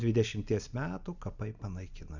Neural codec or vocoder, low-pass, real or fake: none; 7.2 kHz; real